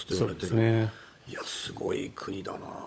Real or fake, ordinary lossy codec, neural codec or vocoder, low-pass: fake; none; codec, 16 kHz, 16 kbps, FunCodec, trained on LibriTTS, 50 frames a second; none